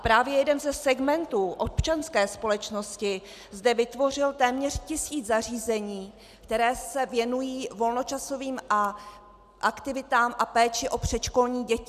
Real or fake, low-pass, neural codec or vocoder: real; 14.4 kHz; none